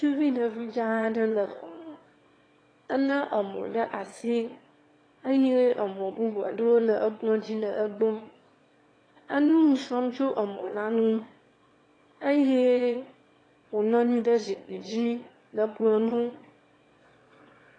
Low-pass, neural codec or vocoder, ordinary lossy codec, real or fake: 9.9 kHz; autoencoder, 22.05 kHz, a latent of 192 numbers a frame, VITS, trained on one speaker; AAC, 32 kbps; fake